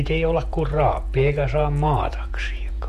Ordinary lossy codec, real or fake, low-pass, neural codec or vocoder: MP3, 64 kbps; fake; 14.4 kHz; vocoder, 48 kHz, 128 mel bands, Vocos